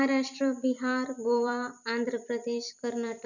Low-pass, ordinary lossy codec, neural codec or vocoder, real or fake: 7.2 kHz; none; vocoder, 44.1 kHz, 128 mel bands every 256 samples, BigVGAN v2; fake